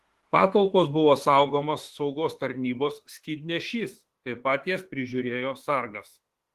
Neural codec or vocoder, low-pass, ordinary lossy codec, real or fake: autoencoder, 48 kHz, 32 numbers a frame, DAC-VAE, trained on Japanese speech; 14.4 kHz; Opus, 16 kbps; fake